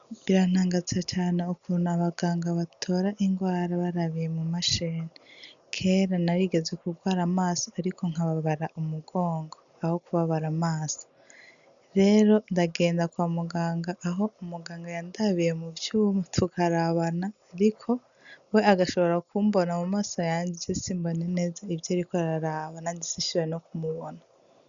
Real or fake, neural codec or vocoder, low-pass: real; none; 7.2 kHz